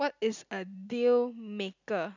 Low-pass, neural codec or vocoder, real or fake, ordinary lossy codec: 7.2 kHz; none; real; none